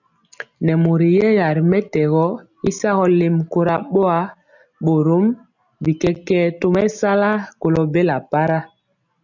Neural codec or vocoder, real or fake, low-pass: none; real; 7.2 kHz